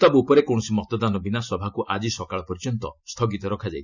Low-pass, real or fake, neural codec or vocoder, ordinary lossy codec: 7.2 kHz; real; none; none